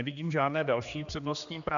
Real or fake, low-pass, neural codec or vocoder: fake; 7.2 kHz; codec, 16 kHz, 2 kbps, X-Codec, HuBERT features, trained on general audio